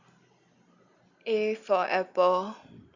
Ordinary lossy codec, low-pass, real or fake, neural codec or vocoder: Opus, 64 kbps; 7.2 kHz; real; none